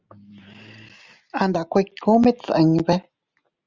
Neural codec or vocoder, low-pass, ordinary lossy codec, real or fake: none; 7.2 kHz; Opus, 32 kbps; real